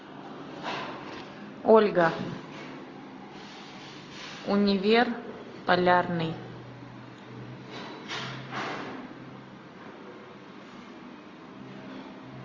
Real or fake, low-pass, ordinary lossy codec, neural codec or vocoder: real; 7.2 kHz; AAC, 32 kbps; none